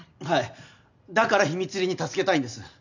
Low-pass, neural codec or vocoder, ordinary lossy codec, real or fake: 7.2 kHz; none; none; real